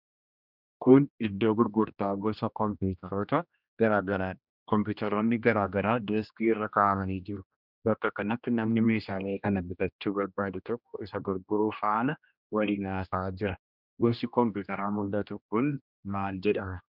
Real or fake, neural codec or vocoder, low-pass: fake; codec, 16 kHz, 1 kbps, X-Codec, HuBERT features, trained on general audio; 5.4 kHz